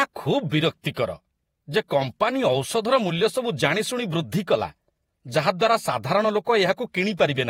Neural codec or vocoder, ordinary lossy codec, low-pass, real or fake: vocoder, 48 kHz, 128 mel bands, Vocos; AAC, 48 kbps; 19.8 kHz; fake